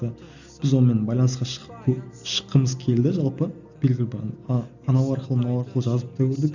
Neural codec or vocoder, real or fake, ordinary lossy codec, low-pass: none; real; none; 7.2 kHz